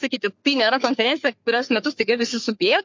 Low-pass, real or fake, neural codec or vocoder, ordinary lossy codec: 7.2 kHz; fake; codec, 44.1 kHz, 3.4 kbps, Pupu-Codec; MP3, 48 kbps